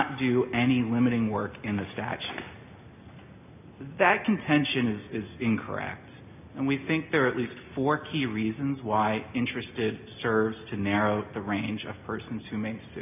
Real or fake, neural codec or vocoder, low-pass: real; none; 3.6 kHz